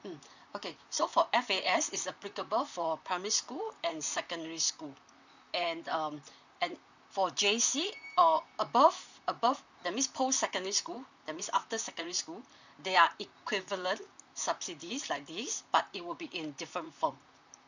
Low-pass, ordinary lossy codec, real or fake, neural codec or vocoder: 7.2 kHz; none; fake; vocoder, 44.1 kHz, 128 mel bands, Pupu-Vocoder